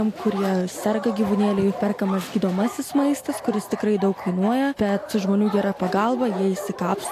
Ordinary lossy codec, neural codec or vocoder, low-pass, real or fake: AAC, 64 kbps; none; 14.4 kHz; real